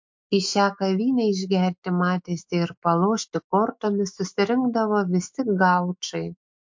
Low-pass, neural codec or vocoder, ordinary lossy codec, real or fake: 7.2 kHz; autoencoder, 48 kHz, 128 numbers a frame, DAC-VAE, trained on Japanese speech; MP3, 48 kbps; fake